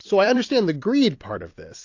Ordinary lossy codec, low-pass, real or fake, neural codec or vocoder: AAC, 48 kbps; 7.2 kHz; fake; vocoder, 44.1 kHz, 128 mel bands every 256 samples, BigVGAN v2